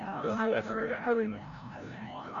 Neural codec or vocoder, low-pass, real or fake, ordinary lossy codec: codec, 16 kHz, 0.5 kbps, FreqCodec, larger model; 7.2 kHz; fake; MP3, 96 kbps